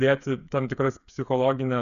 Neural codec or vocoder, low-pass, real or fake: codec, 16 kHz, 8 kbps, FreqCodec, smaller model; 7.2 kHz; fake